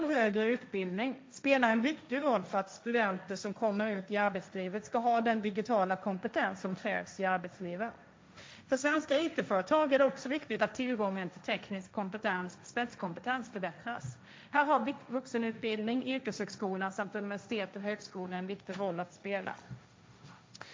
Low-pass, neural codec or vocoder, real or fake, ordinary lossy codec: none; codec, 16 kHz, 1.1 kbps, Voila-Tokenizer; fake; none